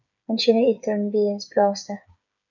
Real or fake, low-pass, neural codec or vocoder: fake; 7.2 kHz; codec, 16 kHz, 8 kbps, FreqCodec, smaller model